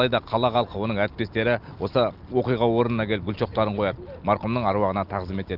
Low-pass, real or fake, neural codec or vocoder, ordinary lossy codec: 5.4 kHz; real; none; Opus, 32 kbps